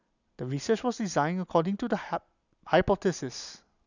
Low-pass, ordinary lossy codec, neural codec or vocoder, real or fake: 7.2 kHz; none; none; real